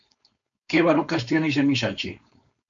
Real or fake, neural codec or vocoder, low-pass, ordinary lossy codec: fake; codec, 16 kHz, 4.8 kbps, FACodec; 7.2 kHz; MP3, 48 kbps